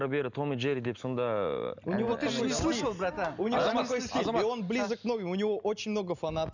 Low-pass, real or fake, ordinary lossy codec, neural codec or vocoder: 7.2 kHz; real; none; none